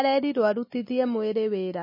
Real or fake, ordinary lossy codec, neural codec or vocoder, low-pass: real; MP3, 24 kbps; none; 5.4 kHz